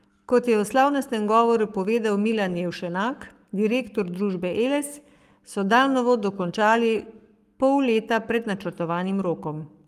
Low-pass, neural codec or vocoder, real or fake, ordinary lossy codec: 14.4 kHz; codec, 44.1 kHz, 7.8 kbps, Pupu-Codec; fake; Opus, 32 kbps